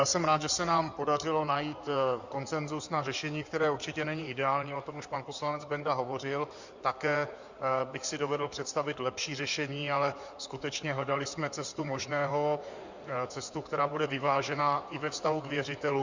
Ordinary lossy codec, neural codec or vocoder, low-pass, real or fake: Opus, 64 kbps; codec, 16 kHz in and 24 kHz out, 2.2 kbps, FireRedTTS-2 codec; 7.2 kHz; fake